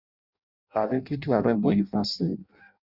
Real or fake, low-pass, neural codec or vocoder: fake; 5.4 kHz; codec, 16 kHz in and 24 kHz out, 0.6 kbps, FireRedTTS-2 codec